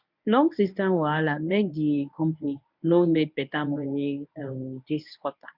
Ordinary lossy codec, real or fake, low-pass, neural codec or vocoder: none; fake; 5.4 kHz; codec, 24 kHz, 0.9 kbps, WavTokenizer, medium speech release version 1